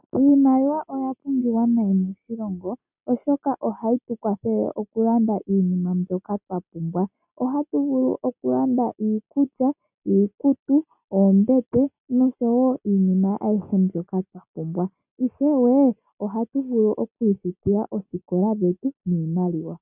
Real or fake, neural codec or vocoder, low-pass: real; none; 3.6 kHz